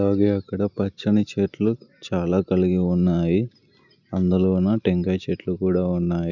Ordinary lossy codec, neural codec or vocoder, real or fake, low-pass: none; none; real; 7.2 kHz